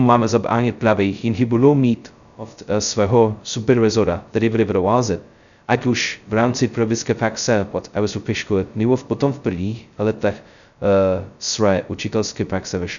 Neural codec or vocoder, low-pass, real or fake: codec, 16 kHz, 0.2 kbps, FocalCodec; 7.2 kHz; fake